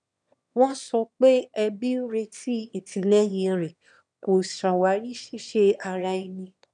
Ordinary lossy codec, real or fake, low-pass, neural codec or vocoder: none; fake; 9.9 kHz; autoencoder, 22.05 kHz, a latent of 192 numbers a frame, VITS, trained on one speaker